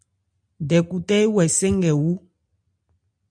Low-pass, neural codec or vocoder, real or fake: 9.9 kHz; none; real